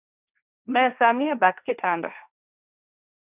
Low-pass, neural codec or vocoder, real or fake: 3.6 kHz; codec, 16 kHz, 1.1 kbps, Voila-Tokenizer; fake